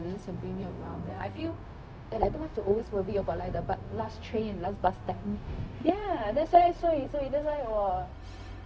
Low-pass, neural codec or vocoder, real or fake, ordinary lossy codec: none; codec, 16 kHz, 0.4 kbps, LongCat-Audio-Codec; fake; none